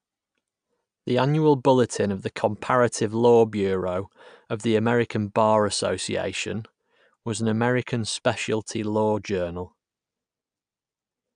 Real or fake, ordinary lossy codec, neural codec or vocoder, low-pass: real; AAC, 96 kbps; none; 9.9 kHz